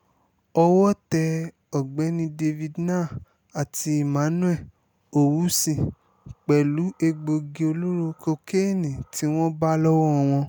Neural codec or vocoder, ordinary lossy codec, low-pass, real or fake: none; none; none; real